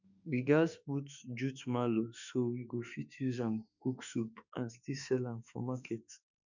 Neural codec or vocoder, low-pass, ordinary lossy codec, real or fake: autoencoder, 48 kHz, 32 numbers a frame, DAC-VAE, trained on Japanese speech; 7.2 kHz; none; fake